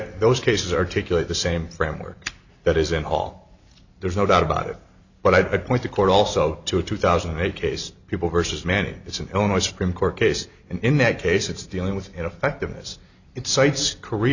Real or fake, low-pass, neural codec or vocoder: real; 7.2 kHz; none